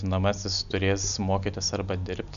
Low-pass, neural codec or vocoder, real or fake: 7.2 kHz; none; real